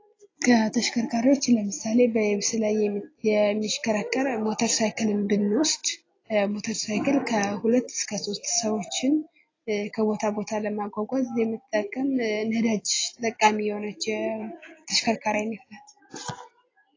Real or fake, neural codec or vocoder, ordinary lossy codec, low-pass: real; none; AAC, 32 kbps; 7.2 kHz